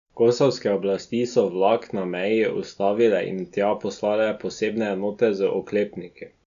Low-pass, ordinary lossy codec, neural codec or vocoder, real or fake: 7.2 kHz; none; none; real